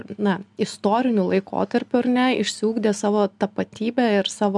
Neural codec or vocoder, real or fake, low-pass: none; real; 10.8 kHz